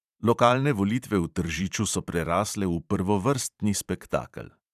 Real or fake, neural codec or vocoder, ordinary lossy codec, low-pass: fake; vocoder, 44.1 kHz, 128 mel bands every 512 samples, BigVGAN v2; none; 14.4 kHz